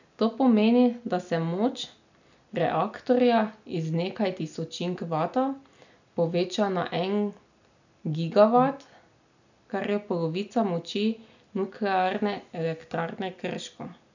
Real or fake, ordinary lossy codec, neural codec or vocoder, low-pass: real; none; none; 7.2 kHz